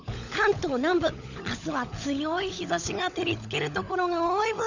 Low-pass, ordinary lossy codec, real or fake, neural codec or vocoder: 7.2 kHz; none; fake; codec, 16 kHz, 16 kbps, FunCodec, trained on LibriTTS, 50 frames a second